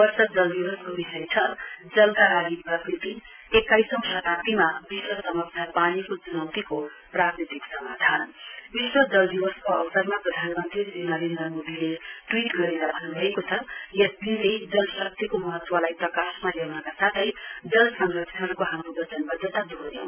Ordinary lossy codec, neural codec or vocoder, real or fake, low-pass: none; none; real; 3.6 kHz